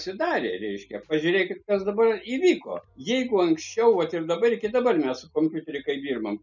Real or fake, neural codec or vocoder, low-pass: real; none; 7.2 kHz